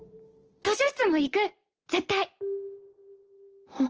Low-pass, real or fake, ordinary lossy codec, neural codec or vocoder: 7.2 kHz; real; Opus, 16 kbps; none